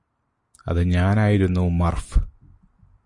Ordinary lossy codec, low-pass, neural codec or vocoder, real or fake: MP3, 48 kbps; 10.8 kHz; none; real